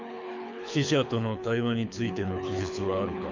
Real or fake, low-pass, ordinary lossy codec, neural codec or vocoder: fake; 7.2 kHz; none; codec, 24 kHz, 6 kbps, HILCodec